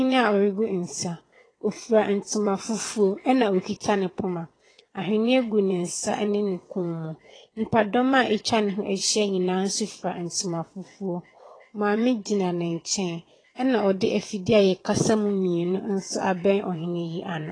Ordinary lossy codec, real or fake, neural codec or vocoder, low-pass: AAC, 32 kbps; fake; vocoder, 22.05 kHz, 80 mel bands, WaveNeXt; 9.9 kHz